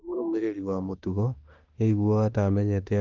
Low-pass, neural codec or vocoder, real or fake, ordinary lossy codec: 7.2 kHz; autoencoder, 48 kHz, 32 numbers a frame, DAC-VAE, trained on Japanese speech; fake; Opus, 24 kbps